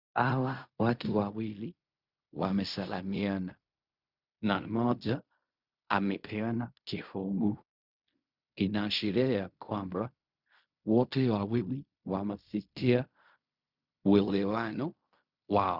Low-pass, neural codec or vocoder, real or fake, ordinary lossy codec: 5.4 kHz; codec, 16 kHz in and 24 kHz out, 0.4 kbps, LongCat-Audio-Codec, fine tuned four codebook decoder; fake; Opus, 64 kbps